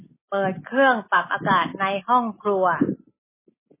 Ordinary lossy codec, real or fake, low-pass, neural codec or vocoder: MP3, 16 kbps; real; 3.6 kHz; none